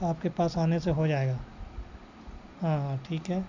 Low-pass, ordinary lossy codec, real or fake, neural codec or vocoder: 7.2 kHz; none; real; none